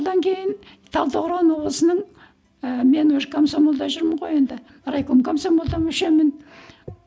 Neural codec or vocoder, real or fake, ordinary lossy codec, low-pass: none; real; none; none